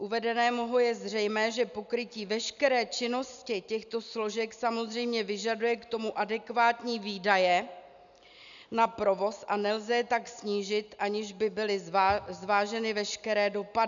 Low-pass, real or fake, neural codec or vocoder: 7.2 kHz; real; none